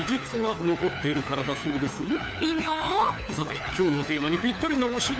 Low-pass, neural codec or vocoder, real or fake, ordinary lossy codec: none; codec, 16 kHz, 4 kbps, FunCodec, trained on LibriTTS, 50 frames a second; fake; none